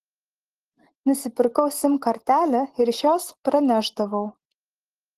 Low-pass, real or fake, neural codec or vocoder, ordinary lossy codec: 14.4 kHz; real; none; Opus, 16 kbps